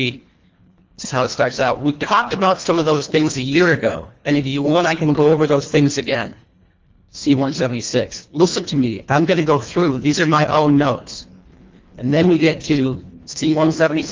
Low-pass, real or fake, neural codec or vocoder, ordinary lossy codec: 7.2 kHz; fake; codec, 24 kHz, 1.5 kbps, HILCodec; Opus, 32 kbps